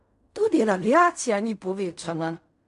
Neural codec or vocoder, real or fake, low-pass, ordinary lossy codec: codec, 16 kHz in and 24 kHz out, 0.4 kbps, LongCat-Audio-Codec, fine tuned four codebook decoder; fake; 10.8 kHz; AAC, 96 kbps